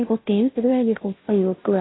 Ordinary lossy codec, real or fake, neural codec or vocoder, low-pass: AAC, 16 kbps; fake; codec, 16 kHz, 0.5 kbps, FunCodec, trained on Chinese and English, 25 frames a second; 7.2 kHz